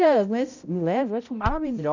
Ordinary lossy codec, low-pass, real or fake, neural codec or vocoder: none; 7.2 kHz; fake; codec, 16 kHz, 0.5 kbps, X-Codec, HuBERT features, trained on balanced general audio